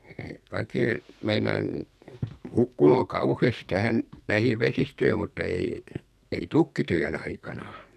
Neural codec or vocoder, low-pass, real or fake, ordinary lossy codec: codec, 44.1 kHz, 2.6 kbps, SNAC; 14.4 kHz; fake; none